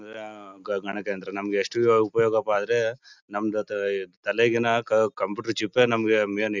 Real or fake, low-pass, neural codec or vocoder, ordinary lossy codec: real; 7.2 kHz; none; none